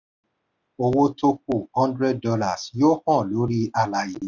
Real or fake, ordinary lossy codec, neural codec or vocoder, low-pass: real; none; none; 7.2 kHz